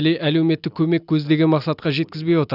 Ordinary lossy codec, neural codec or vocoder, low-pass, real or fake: none; none; 5.4 kHz; real